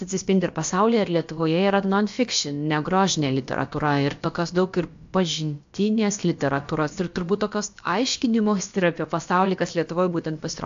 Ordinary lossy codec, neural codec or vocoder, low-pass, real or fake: AAC, 64 kbps; codec, 16 kHz, about 1 kbps, DyCAST, with the encoder's durations; 7.2 kHz; fake